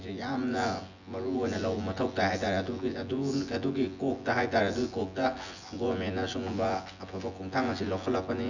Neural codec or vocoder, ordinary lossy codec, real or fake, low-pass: vocoder, 24 kHz, 100 mel bands, Vocos; none; fake; 7.2 kHz